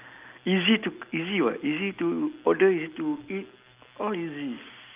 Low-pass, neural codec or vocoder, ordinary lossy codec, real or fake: 3.6 kHz; none; Opus, 24 kbps; real